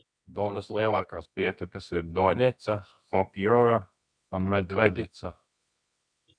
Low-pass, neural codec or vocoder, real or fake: 9.9 kHz; codec, 24 kHz, 0.9 kbps, WavTokenizer, medium music audio release; fake